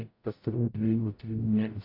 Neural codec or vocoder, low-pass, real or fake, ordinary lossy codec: codec, 44.1 kHz, 0.9 kbps, DAC; 5.4 kHz; fake; AAC, 32 kbps